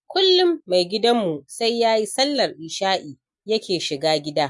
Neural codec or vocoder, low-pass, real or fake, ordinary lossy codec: none; 10.8 kHz; real; MP3, 64 kbps